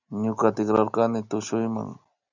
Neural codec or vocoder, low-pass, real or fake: none; 7.2 kHz; real